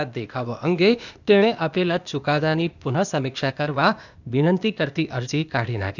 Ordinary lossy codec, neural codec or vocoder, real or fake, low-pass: none; codec, 16 kHz, 0.8 kbps, ZipCodec; fake; 7.2 kHz